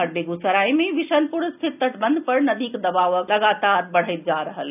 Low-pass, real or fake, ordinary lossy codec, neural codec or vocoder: 3.6 kHz; real; none; none